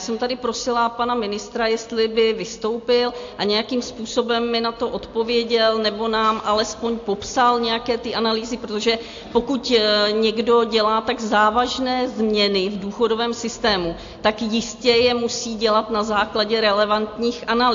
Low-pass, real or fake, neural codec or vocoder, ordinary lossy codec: 7.2 kHz; real; none; AAC, 48 kbps